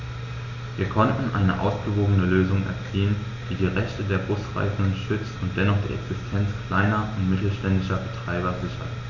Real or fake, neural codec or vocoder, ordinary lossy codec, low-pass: real; none; none; 7.2 kHz